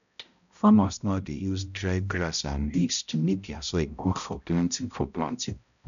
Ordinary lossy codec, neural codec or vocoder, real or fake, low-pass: none; codec, 16 kHz, 0.5 kbps, X-Codec, HuBERT features, trained on balanced general audio; fake; 7.2 kHz